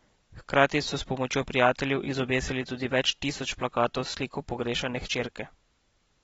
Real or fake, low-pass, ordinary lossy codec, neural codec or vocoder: real; 19.8 kHz; AAC, 24 kbps; none